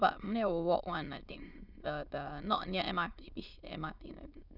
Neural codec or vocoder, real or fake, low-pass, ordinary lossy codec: autoencoder, 22.05 kHz, a latent of 192 numbers a frame, VITS, trained on many speakers; fake; 5.4 kHz; none